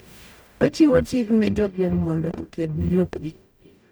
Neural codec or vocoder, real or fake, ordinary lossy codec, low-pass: codec, 44.1 kHz, 0.9 kbps, DAC; fake; none; none